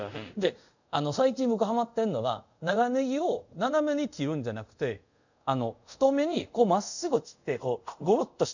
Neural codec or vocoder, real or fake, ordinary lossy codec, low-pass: codec, 24 kHz, 0.5 kbps, DualCodec; fake; none; 7.2 kHz